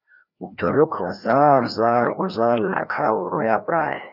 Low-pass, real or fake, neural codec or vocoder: 5.4 kHz; fake; codec, 16 kHz, 1 kbps, FreqCodec, larger model